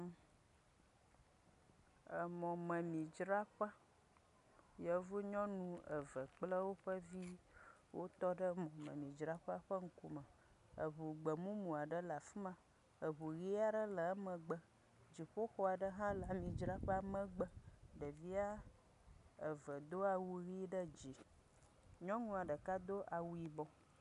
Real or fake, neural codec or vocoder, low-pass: real; none; 10.8 kHz